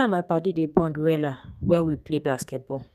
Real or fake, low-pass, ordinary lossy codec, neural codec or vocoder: fake; 14.4 kHz; none; codec, 32 kHz, 1.9 kbps, SNAC